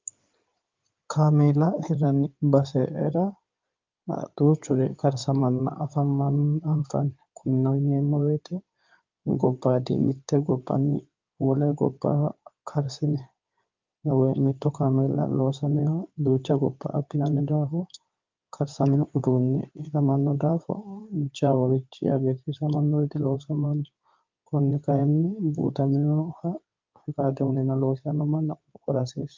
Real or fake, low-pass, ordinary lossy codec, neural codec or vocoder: fake; 7.2 kHz; Opus, 24 kbps; codec, 16 kHz in and 24 kHz out, 2.2 kbps, FireRedTTS-2 codec